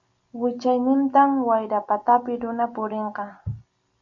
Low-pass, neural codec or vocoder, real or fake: 7.2 kHz; none; real